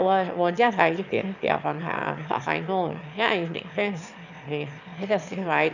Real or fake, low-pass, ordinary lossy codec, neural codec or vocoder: fake; 7.2 kHz; none; autoencoder, 22.05 kHz, a latent of 192 numbers a frame, VITS, trained on one speaker